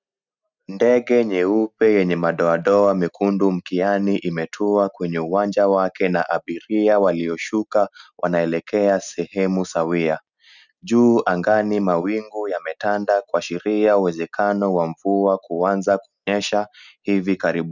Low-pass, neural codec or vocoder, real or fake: 7.2 kHz; none; real